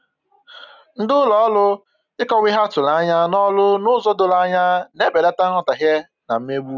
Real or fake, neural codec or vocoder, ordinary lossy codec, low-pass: real; none; none; 7.2 kHz